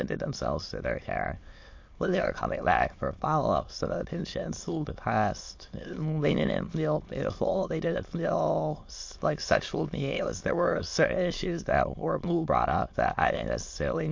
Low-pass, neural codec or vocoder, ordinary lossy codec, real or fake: 7.2 kHz; autoencoder, 22.05 kHz, a latent of 192 numbers a frame, VITS, trained on many speakers; MP3, 48 kbps; fake